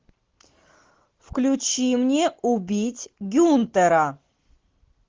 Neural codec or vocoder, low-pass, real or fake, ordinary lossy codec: none; 7.2 kHz; real; Opus, 16 kbps